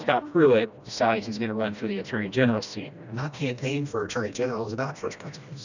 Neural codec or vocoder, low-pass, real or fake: codec, 16 kHz, 1 kbps, FreqCodec, smaller model; 7.2 kHz; fake